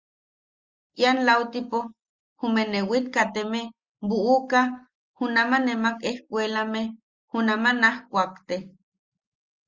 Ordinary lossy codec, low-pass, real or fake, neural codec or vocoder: Opus, 32 kbps; 7.2 kHz; real; none